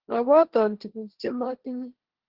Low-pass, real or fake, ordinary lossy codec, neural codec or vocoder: 5.4 kHz; fake; Opus, 16 kbps; codec, 16 kHz, 1.1 kbps, Voila-Tokenizer